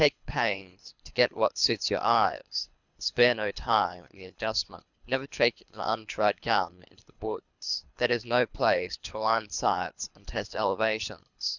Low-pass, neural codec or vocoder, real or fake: 7.2 kHz; codec, 24 kHz, 3 kbps, HILCodec; fake